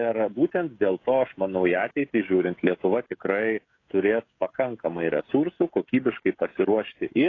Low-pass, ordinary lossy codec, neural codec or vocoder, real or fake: 7.2 kHz; AAC, 32 kbps; codec, 16 kHz, 16 kbps, FreqCodec, smaller model; fake